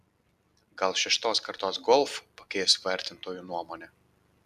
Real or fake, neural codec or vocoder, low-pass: real; none; 14.4 kHz